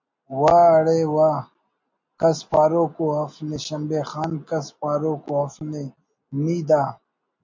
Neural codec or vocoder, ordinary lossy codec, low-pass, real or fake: none; MP3, 48 kbps; 7.2 kHz; real